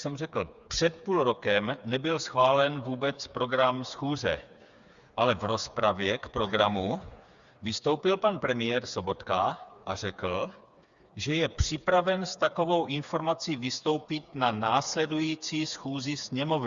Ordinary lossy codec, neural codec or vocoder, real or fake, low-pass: Opus, 64 kbps; codec, 16 kHz, 4 kbps, FreqCodec, smaller model; fake; 7.2 kHz